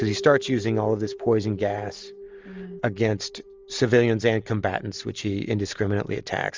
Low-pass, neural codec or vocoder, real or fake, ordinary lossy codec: 7.2 kHz; none; real; Opus, 32 kbps